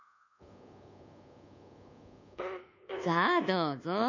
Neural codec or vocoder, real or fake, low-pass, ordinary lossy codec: codec, 24 kHz, 1.2 kbps, DualCodec; fake; 7.2 kHz; none